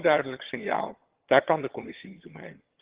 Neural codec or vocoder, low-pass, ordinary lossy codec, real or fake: vocoder, 22.05 kHz, 80 mel bands, HiFi-GAN; 3.6 kHz; Opus, 16 kbps; fake